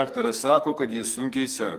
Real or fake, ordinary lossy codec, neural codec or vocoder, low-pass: fake; Opus, 32 kbps; codec, 44.1 kHz, 2.6 kbps, SNAC; 14.4 kHz